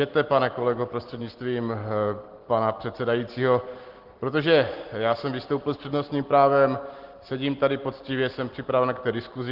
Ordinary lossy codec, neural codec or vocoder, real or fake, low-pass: Opus, 16 kbps; none; real; 5.4 kHz